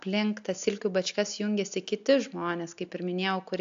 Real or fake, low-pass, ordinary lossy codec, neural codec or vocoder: real; 7.2 kHz; AAC, 64 kbps; none